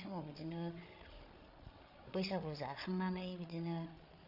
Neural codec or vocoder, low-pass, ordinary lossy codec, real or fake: codec, 16 kHz, 8 kbps, FreqCodec, larger model; 5.4 kHz; none; fake